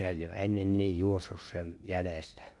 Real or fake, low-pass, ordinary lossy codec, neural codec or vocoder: fake; 10.8 kHz; none; codec, 16 kHz in and 24 kHz out, 0.8 kbps, FocalCodec, streaming, 65536 codes